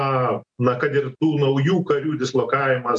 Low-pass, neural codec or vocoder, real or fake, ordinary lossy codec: 9.9 kHz; none; real; MP3, 64 kbps